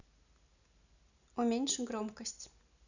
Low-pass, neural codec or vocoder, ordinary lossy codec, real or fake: 7.2 kHz; none; none; real